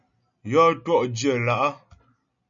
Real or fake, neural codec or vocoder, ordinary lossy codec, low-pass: real; none; MP3, 96 kbps; 7.2 kHz